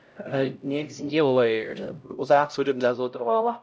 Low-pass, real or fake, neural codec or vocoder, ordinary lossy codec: none; fake; codec, 16 kHz, 0.5 kbps, X-Codec, HuBERT features, trained on LibriSpeech; none